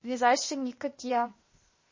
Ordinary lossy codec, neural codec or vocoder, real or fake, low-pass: MP3, 32 kbps; codec, 16 kHz, 0.8 kbps, ZipCodec; fake; 7.2 kHz